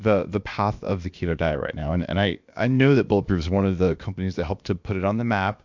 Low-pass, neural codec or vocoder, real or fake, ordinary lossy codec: 7.2 kHz; codec, 16 kHz, about 1 kbps, DyCAST, with the encoder's durations; fake; MP3, 64 kbps